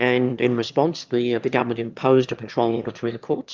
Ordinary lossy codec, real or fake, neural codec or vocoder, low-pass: Opus, 32 kbps; fake; autoencoder, 22.05 kHz, a latent of 192 numbers a frame, VITS, trained on one speaker; 7.2 kHz